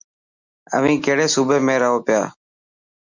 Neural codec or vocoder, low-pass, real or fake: none; 7.2 kHz; real